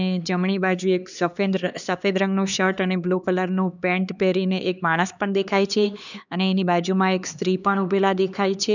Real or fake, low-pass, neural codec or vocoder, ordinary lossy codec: fake; 7.2 kHz; codec, 16 kHz, 2 kbps, X-Codec, HuBERT features, trained on LibriSpeech; none